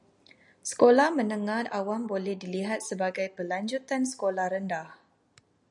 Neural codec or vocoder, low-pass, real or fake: none; 10.8 kHz; real